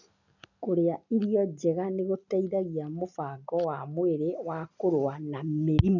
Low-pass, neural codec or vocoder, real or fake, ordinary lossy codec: 7.2 kHz; none; real; none